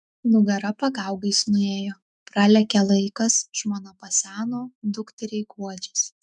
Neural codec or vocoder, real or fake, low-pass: autoencoder, 48 kHz, 128 numbers a frame, DAC-VAE, trained on Japanese speech; fake; 10.8 kHz